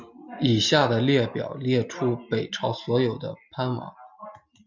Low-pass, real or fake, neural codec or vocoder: 7.2 kHz; real; none